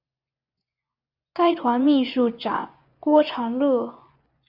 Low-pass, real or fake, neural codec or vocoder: 5.4 kHz; fake; vocoder, 24 kHz, 100 mel bands, Vocos